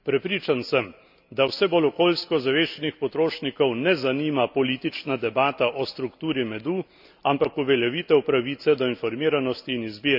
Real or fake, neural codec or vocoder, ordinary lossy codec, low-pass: real; none; none; 5.4 kHz